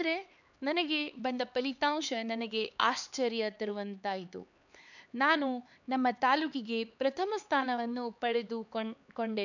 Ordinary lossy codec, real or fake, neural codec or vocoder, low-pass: none; fake; codec, 16 kHz, 4 kbps, X-Codec, HuBERT features, trained on LibriSpeech; 7.2 kHz